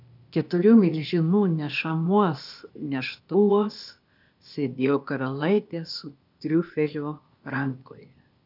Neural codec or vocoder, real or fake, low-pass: codec, 16 kHz, 0.8 kbps, ZipCodec; fake; 5.4 kHz